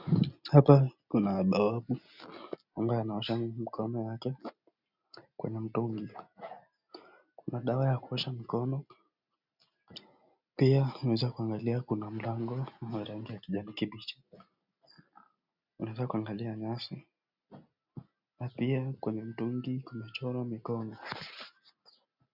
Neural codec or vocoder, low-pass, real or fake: none; 5.4 kHz; real